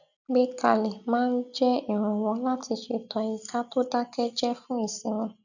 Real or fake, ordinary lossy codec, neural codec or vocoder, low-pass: real; none; none; 7.2 kHz